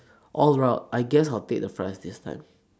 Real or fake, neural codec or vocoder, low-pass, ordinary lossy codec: real; none; none; none